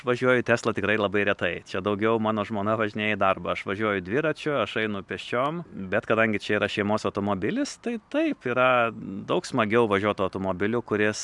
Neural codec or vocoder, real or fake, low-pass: none; real; 10.8 kHz